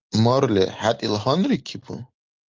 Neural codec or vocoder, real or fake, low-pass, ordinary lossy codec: none; real; 7.2 kHz; Opus, 32 kbps